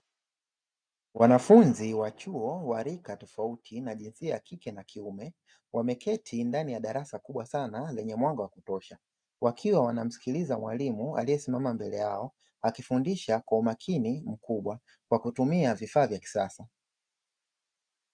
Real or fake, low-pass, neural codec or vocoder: real; 9.9 kHz; none